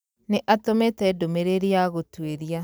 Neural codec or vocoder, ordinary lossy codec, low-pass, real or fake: none; none; none; real